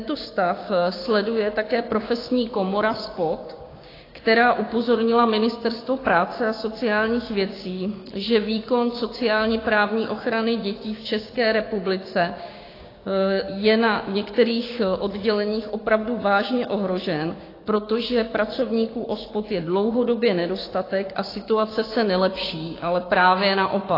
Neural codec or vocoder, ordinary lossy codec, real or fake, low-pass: codec, 16 kHz, 6 kbps, DAC; AAC, 24 kbps; fake; 5.4 kHz